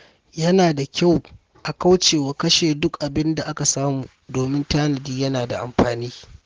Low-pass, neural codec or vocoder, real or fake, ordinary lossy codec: 7.2 kHz; none; real; Opus, 16 kbps